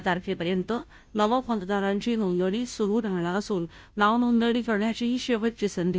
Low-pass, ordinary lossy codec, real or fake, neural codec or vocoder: none; none; fake; codec, 16 kHz, 0.5 kbps, FunCodec, trained on Chinese and English, 25 frames a second